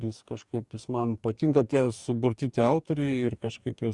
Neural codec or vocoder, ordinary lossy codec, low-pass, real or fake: codec, 44.1 kHz, 2.6 kbps, DAC; Opus, 64 kbps; 10.8 kHz; fake